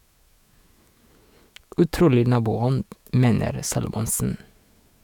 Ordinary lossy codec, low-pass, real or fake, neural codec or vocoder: none; 19.8 kHz; fake; autoencoder, 48 kHz, 128 numbers a frame, DAC-VAE, trained on Japanese speech